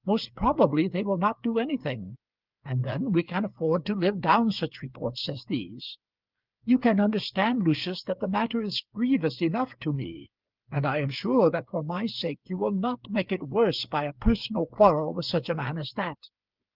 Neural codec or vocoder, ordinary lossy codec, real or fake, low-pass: codec, 16 kHz, 8 kbps, FreqCodec, smaller model; Opus, 32 kbps; fake; 5.4 kHz